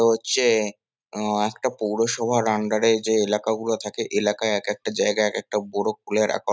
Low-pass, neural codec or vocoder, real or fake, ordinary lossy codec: none; none; real; none